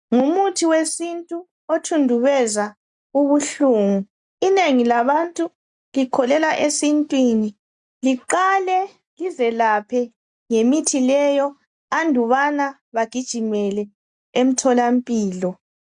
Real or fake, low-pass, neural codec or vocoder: real; 10.8 kHz; none